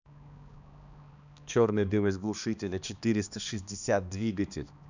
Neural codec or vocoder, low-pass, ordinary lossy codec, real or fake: codec, 16 kHz, 2 kbps, X-Codec, HuBERT features, trained on balanced general audio; 7.2 kHz; none; fake